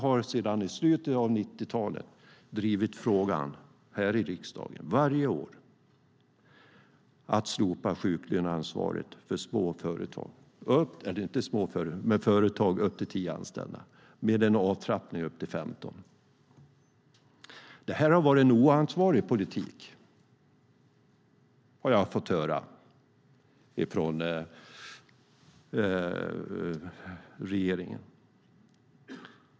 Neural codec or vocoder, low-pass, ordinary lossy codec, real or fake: none; none; none; real